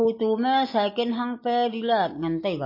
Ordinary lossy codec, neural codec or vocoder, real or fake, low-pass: MP3, 24 kbps; none; real; 5.4 kHz